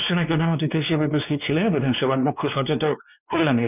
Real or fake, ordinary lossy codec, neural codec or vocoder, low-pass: fake; none; codec, 16 kHz, 2 kbps, X-Codec, WavLM features, trained on Multilingual LibriSpeech; 3.6 kHz